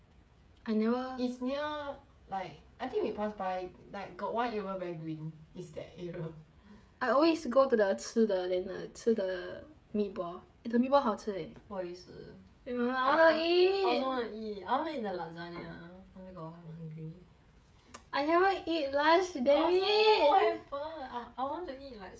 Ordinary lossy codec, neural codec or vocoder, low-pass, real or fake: none; codec, 16 kHz, 16 kbps, FreqCodec, smaller model; none; fake